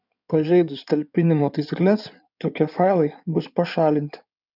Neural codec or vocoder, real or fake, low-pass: codec, 16 kHz in and 24 kHz out, 2.2 kbps, FireRedTTS-2 codec; fake; 5.4 kHz